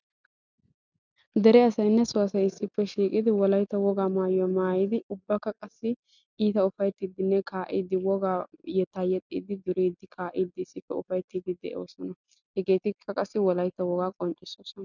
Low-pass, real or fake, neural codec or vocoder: 7.2 kHz; real; none